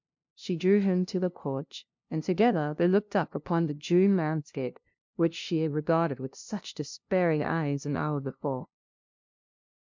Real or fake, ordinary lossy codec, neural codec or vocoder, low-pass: fake; MP3, 64 kbps; codec, 16 kHz, 0.5 kbps, FunCodec, trained on LibriTTS, 25 frames a second; 7.2 kHz